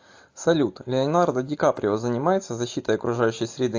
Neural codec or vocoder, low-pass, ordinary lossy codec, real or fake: none; 7.2 kHz; AAC, 48 kbps; real